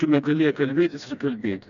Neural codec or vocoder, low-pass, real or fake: codec, 16 kHz, 1 kbps, FreqCodec, smaller model; 7.2 kHz; fake